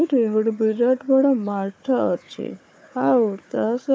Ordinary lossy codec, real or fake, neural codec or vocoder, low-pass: none; fake; codec, 16 kHz, 4 kbps, FunCodec, trained on Chinese and English, 50 frames a second; none